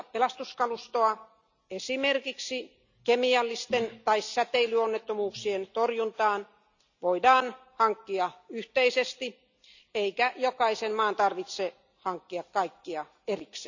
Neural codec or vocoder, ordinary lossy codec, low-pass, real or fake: none; none; 7.2 kHz; real